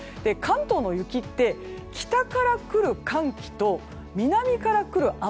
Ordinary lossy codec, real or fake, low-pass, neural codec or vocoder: none; real; none; none